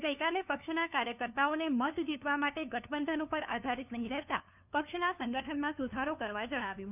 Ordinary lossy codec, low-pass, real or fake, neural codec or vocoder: none; 3.6 kHz; fake; codec, 16 kHz, 2 kbps, FunCodec, trained on LibriTTS, 25 frames a second